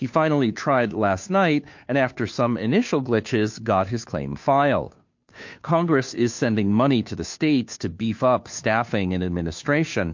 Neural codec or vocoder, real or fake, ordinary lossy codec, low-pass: codec, 16 kHz, 2 kbps, FunCodec, trained on Chinese and English, 25 frames a second; fake; MP3, 48 kbps; 7.2 kHz